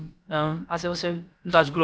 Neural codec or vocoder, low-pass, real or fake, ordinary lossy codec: codec, 16 kHz, about 1 kbps, DyCAST, with the encoder's durations; none; fake; none